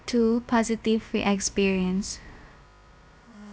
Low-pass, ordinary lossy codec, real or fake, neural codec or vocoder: none; none; fake; codec, 16 kHz, about 1 kbps, DyCAST, with the encoder's durations